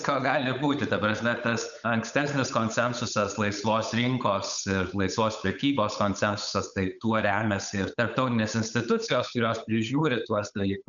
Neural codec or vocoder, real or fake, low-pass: codec, 16 kHz, 8 kbps, FunCodec, trained on Chinese and English, 25 frames a second; fake; 7.2 kHz